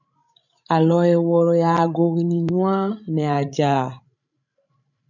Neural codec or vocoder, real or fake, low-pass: codec, 16 kHz, 16 kbps, FreqCodec, larger model; fake; 7.2 kHz